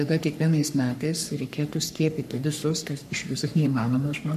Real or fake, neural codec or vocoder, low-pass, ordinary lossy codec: fake; codec, 44.1 kHz, 3.4 kbps, Pupu-Codec; 14.4 kHz; MP3, 96 kbps